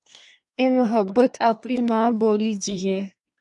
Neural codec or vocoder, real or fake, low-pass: codec, 24 kHz, 1 kbps, SNAC; fake; 10.8 kHz